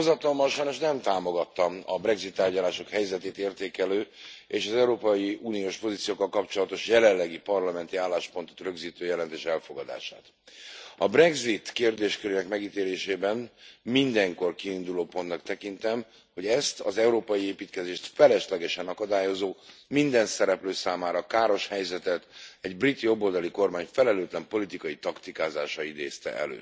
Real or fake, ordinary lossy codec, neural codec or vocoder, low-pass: real; none; none; none